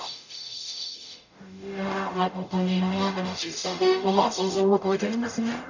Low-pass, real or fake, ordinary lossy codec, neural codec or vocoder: 7.2 kHz; fake; none; codec, 44.1 kHz, 0.9 kbps, DAC